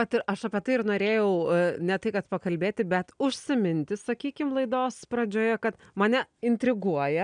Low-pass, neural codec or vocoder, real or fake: 9.9 kHz; none; real